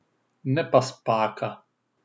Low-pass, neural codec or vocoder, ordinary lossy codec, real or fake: none; none; none; real